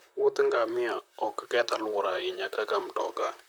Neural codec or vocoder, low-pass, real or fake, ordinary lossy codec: vocoder, 44.1 kHz, 128 mel bands, Pupu-Vocoder; none; fake; none